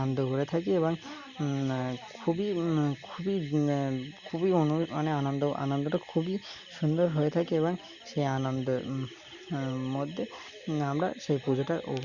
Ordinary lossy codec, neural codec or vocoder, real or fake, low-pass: Opus, 64 kbps; none; real; 7.2 kHz